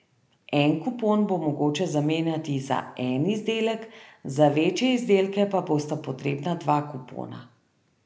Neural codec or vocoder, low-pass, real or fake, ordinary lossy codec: none; none; real; none